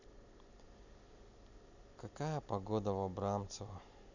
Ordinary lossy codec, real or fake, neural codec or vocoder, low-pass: none; real; none; 7.2 kHz